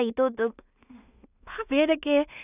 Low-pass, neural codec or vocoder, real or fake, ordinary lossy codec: 3.6 kHz; codec, 16 kHz in and 24 kHz out, 0.4 kbps, LongCat-Audio-Codec, two codebook decoder; fake; none